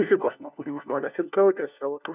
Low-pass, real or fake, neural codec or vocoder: 3.6 kHz; fake; codec, 16 kHz, 1 kbps, FunCodec, trained on LibriTTS, 50 frames a second